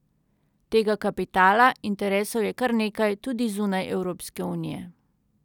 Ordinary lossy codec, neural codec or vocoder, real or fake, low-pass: none; none; real; 19.8 kHz